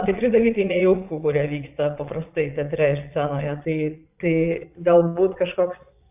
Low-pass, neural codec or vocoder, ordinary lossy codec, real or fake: 3.6 kHz; vocoder, 22.05 kHz, 80 mel bands, Vocos; AAC, 32 kbps; fake